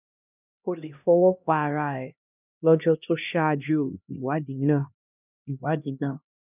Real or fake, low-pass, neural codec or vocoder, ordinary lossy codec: fake; 3.6 kHz; codec, 16 kHz, 1 kbps, X-Codec, HuBERT features, trained on LibriSpeech; none